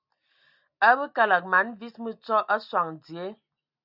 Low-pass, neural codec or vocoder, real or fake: 5.4 kHz; none; real